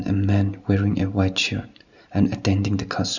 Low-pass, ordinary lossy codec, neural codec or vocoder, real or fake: 7.2 kHz; none; none; real